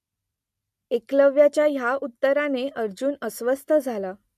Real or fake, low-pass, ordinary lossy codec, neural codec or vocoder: real; 14.4 kHz; MP3, 64 kbps; none